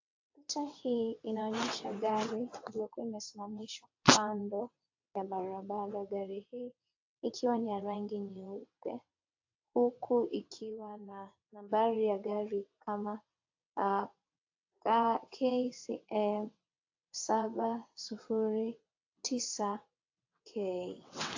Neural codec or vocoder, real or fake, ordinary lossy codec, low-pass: vocoder, 22.05 kHz, 80 mel bands, WaveNeXt; fake; AAC, 48 kbps; 7.2 kHz